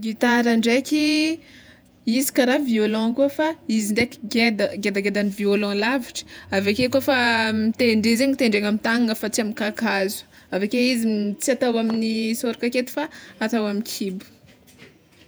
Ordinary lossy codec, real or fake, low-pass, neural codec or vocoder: none; fake; none; vocoder, 48 kHz, 128 mel bands, Vocos